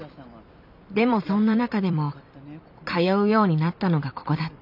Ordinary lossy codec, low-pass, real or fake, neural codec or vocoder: none; 5.4 kHz; fake; vocoder, 44.1 kHz, 128 mel bands every 256 samples, BigVGAN v2